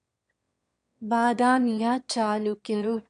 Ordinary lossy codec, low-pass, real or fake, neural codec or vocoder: MP3, 64 kbps; 9.9 kHz; fake; autoencoder, 22.05 kHz, a latent of 192 numbers a frame, VITS, trained on one speaker